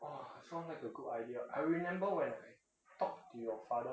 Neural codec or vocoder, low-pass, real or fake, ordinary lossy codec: none; none; real; none